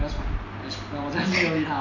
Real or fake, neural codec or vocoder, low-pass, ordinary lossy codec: real; none; 7.2 kHz; AAC, 48 kbps